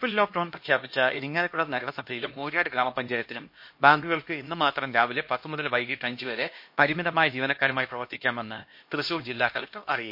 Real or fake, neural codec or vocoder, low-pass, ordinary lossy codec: fake; codec, 16 kHz, 1 kbps, X-Codec, HuBERT features, trained on LibriSpeech; 5.4 kHz; MP3, 32 kbps